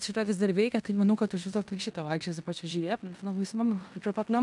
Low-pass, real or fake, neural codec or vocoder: 10.8 kHz; fake; codec, 16 kHz in and 24 kHz out, 0.9 kbps, LongCat-Audio-Codec, fine tuned four codebook decoder